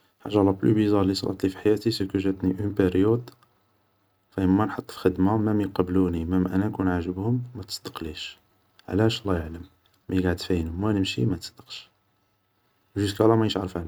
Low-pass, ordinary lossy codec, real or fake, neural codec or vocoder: none; none; real; none